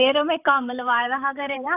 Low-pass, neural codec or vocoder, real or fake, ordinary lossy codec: 3.6 kHz; vocoder, 44.1 kHz, 128 mel bands, Pupu-Vocoder; fake; AAC, 32 kbps